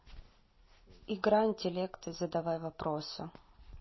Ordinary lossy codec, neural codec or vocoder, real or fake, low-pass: MP3, 24 kbps; none; real; 7.2 kHz